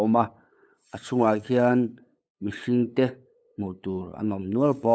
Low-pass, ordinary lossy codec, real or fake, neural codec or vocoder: none; none; fake; codec, 16 kHz, 8 kbps, FunCodec, trained on LibriTTS, 25 frames a second